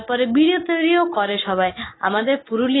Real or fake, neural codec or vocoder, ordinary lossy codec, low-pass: real; none; AAC, 16 kbps; 7.2 kHz